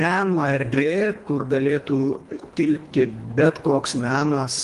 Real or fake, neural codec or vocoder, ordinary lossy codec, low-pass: fake; codec, 24 kHz, 1.5 kbps, HILCodec; Opus, 24 kbps; 10.8 kHz